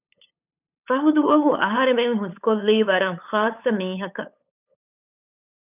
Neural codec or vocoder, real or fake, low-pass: codec, 16 kHz, 8 kbps, FunCodec, trained on LibriTTS, 25 frames a second; fake; 3.6 kHz